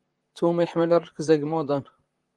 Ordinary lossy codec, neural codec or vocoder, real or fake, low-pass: Opus, 24 kbps; vocoder, 44.1 kHz, 128 mel bands, Pupu-Vocoder; fake; 10.8 kHz